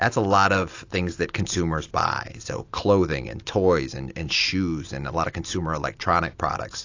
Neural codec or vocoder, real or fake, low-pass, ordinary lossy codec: none; real; 7.2 kHz; AAC, 48 kbps